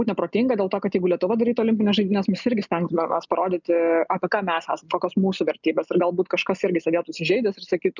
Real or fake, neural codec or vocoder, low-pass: real; none; 7.2 kHz